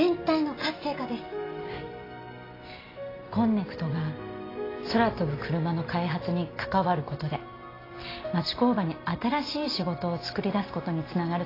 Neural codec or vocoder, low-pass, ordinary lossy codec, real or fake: none; 5.4 kHz; AAC, 24 kbps; real